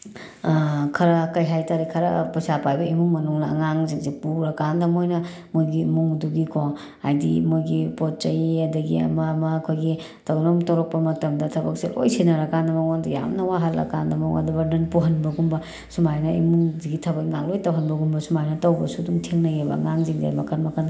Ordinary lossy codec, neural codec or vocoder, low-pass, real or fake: none; none; none; real